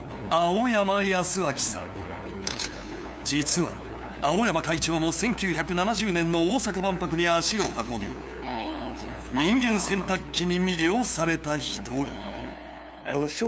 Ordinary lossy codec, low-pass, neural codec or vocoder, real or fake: none; none; codec, 16 kHz, 2 kbps, FunCodec, trained on LibriTTS, 25 frames a second; fake